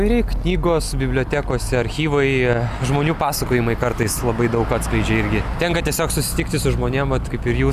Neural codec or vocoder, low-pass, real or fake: none; 14.4 kHz; real